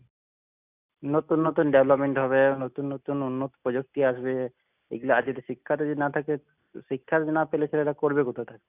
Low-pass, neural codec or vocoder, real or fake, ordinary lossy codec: 3.6 kHz; none; real; none